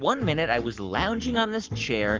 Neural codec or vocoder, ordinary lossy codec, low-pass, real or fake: codec, 44.1 kHz, 7.8 kbps, Pupu-Codec; Opus, 24 kbps; 7.2 kHz; fake